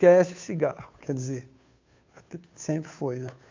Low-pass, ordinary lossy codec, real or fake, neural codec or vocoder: 7.2 kHz; MP3, 64 kbps; fake; codec, 16 kHz, 2 kbps, FunCodec, trained on Chinese and English, 25 frames a second